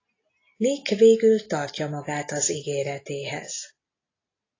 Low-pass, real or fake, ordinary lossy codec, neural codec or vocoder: 7.2 kHz; real; AAC, 32 kbps; none